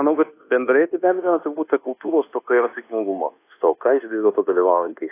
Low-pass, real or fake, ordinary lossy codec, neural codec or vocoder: 3.6 kHz; fake; AAC, 24 kbps; codec, 24 kHz, 1.2 kbps, DualCodec